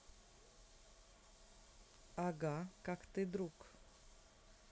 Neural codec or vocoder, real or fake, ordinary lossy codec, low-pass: none; real; none; none